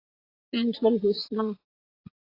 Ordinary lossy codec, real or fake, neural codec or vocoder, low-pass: AAC, 24 kbps; fake; vocoder, 44.1 kHz, 80 mel bands, Vocos; 5.4 kHz